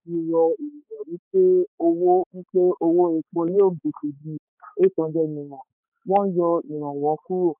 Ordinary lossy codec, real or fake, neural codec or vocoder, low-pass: none; fake; codec, 16 kHz, 4 kbps, X-Codec, HuBERT features, trained on balanced general audio; 3.6 kHz